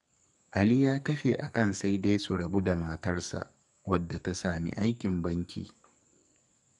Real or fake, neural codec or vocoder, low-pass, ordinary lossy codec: fake; codec, 44.1 kHz, 2.6 kbps, SNAC; 10.8 kHz; none